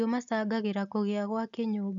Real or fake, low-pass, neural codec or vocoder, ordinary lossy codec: real; 7.2 kHz; none; none